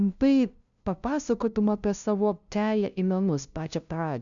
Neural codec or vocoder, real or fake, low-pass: codec, 16 kHz, 0.5 kbps, FunCodec, trained on LibriTTS, 25 frames a second; fake; 7.2 kHz